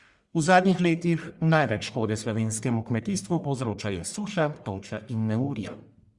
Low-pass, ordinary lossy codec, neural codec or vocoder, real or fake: 10.8 kHz; Opus, 64 kbps; codec, 44.1 kHz, 1.7 kbps, Pupu-Codec; fake